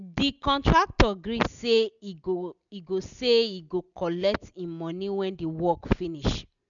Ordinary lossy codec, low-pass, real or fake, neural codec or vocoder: none; 7.2 kHz; real; none